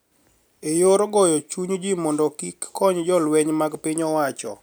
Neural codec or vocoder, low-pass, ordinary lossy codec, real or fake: none; none; none; real